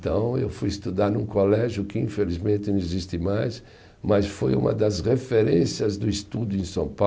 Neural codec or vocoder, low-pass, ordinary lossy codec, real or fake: none; none; none; real